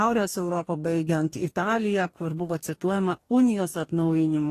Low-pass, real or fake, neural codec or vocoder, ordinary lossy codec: 14.4 kHz; fake; codec, 44.1 kHz, 2.6 kbps, DAC; AAC, 48 kbps